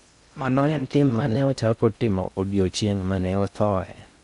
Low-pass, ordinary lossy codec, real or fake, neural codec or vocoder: 10.8 kHz; none; fake; codec, 16 kHz in and 24 kHz out, 0.6 kbps, FocalCodec, streaming, 2048 codes